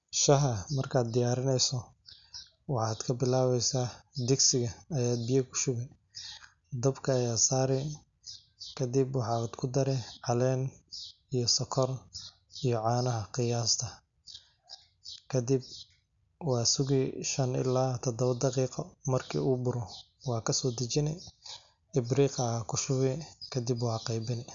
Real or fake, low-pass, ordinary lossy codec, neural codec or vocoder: real; 7.2 kHz; none; none